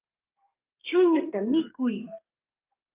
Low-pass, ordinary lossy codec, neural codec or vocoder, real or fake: 3.6 kHz; Opus, 24 kbps; codec, 32 kHz, 1.9 kbps, SNAC; fake